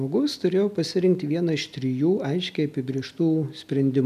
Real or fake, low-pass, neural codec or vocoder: real; 14.4 kHz; none